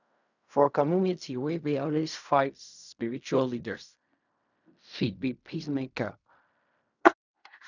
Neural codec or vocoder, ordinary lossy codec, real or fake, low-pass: codec, 16 kHz in and 24 kHz out, 0.4 kbps, LongCat-Audio-Codec, fine tuned four codebook decoder; none; fake; 7.2 kHz